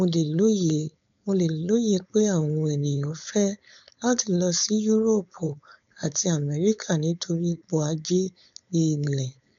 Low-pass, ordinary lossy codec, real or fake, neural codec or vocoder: 7.2 kHz; none; fake; codec, 16 kHz, 4.8 kbps, FACodec